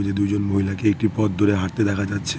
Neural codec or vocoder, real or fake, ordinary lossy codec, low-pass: none; real; none; none